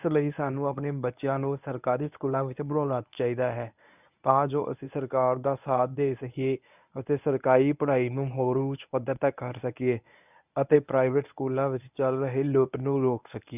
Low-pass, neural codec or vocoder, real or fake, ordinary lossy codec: 3.6 kHz; codec, 24 kHz, 0.9 kbps, WavTokenizer, medium speech release version 1; fake; none